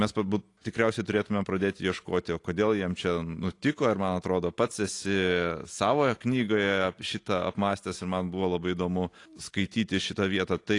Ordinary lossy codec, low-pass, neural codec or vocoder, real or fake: AAC, 48 kbps; 10.8 kHz; none; real